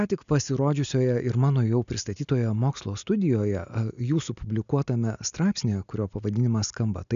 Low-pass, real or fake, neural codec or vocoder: 7.2 kHz; real; none